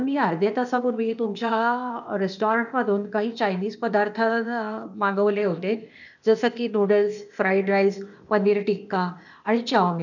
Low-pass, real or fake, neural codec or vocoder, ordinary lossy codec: 7.2 kHz; fake; codec, 16 kHz, 0.8 kbps, ZipCodec; none